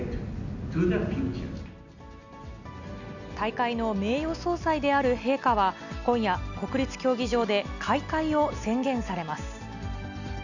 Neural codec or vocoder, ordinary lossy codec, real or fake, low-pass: none; none; real; 7.2 kHz